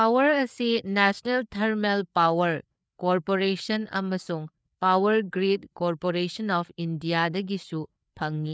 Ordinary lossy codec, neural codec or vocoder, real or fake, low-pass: none; codec, 16 kHz, 4 kbps, FreqCodec, larger model; fake; none